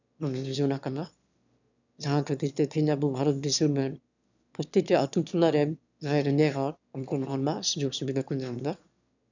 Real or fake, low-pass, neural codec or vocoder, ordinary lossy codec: fake; 7.2 kHz; autoencoder, 22.05 kHz, a latent of 192 numbers a frame, VITS, trained on one speaker; none